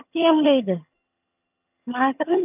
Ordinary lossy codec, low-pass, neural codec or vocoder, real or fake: none; 3.6 kHz; vocoder, 22.05 kHz, 80 mel bands, HiFi-GAN; fake